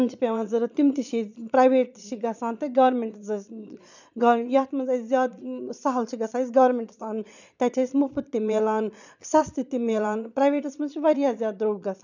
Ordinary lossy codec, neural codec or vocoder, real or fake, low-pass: none; vocoder, 22.05 kHz, 80 mel bands, Vocos; fake; 7.2 kHz